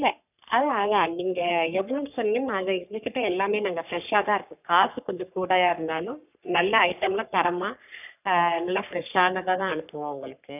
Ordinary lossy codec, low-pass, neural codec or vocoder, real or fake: none; 3.6 kHz; codec, 44.1 kHz, 3.4 kbps, Pupu-Codec; fake